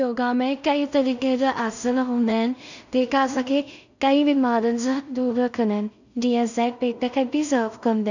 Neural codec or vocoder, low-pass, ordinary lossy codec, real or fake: codec, 16 kHz in and 24 kHz out, 0.4 kbps, LongCat-Audio-Codec, two codebook decoder; 7.2 kHz; none; fake